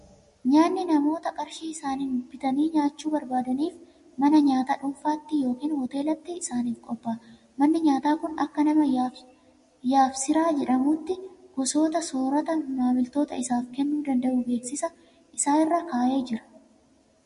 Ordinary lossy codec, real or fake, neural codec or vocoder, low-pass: MP3, 48 kbps; real; none; 10.8 kHz